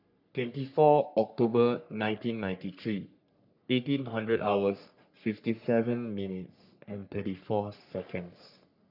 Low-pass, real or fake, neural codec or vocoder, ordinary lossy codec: 5.4 kHz; fake; codec, 44.1 kHz, 3.4 kbps, Pupu-Codec; none